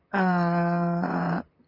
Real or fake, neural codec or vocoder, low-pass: fake; vocoder, 44.1 kHz, 128 mel bands, Pupu-Vocoder; 5.4 kHz